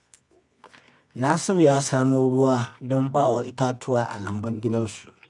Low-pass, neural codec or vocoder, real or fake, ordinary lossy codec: 10.8 kHz; codec, 24 kHz, 0.9 kbps, WavTokenizer, medium music audio release; fake; none